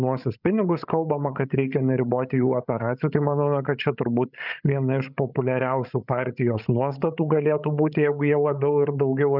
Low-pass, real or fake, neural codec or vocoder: 5.4 kHz; fake; codec, 16 kHz, 16 kbps, FreqCodec, larger model